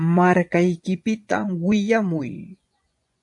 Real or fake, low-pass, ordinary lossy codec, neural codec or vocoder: fake; 10.8 kHz; AAC, 64 kbps; vocoder, 24 kHz, 100 mel bands, Vocos